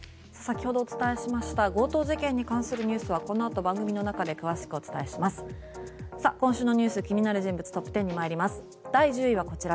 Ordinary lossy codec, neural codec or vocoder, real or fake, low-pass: none; none; real; none